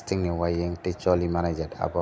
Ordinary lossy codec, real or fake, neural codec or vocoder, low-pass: none; real; none; none